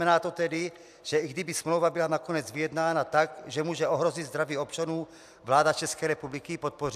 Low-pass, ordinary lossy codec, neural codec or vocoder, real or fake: 14.4 kHz; AAC, 96 kbps; none; real